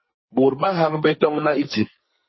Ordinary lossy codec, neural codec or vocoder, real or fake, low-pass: MP3, 24 kbps; codec, 44.1 kHz, 2.6 kbps, SNAC; fake; 7.2 kHz